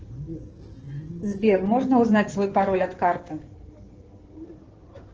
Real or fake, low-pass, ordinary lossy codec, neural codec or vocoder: fake; 7.2 kHz; Opus, 16 kbps; codec, 16 kHz in and 24 kHz out, 2.2 kbps, FireRedTTS-2 codec